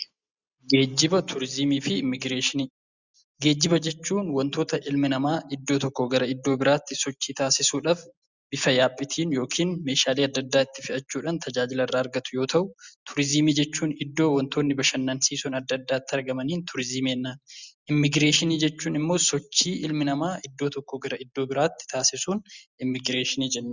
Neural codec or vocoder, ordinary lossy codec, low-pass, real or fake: none; Opus, 64 kbps; 7.2 kHz; real